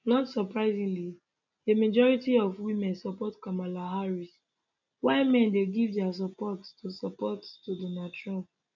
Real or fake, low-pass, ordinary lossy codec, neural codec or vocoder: real; 7.2 kHz; none; none